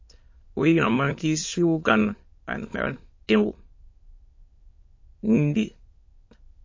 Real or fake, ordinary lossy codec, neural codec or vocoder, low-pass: fake; MP3, 32 kbps; autoencoder, 22.05 kHz, a latent of 192 numbers a frame, VITS, trained on many speakers; 7.2 kHz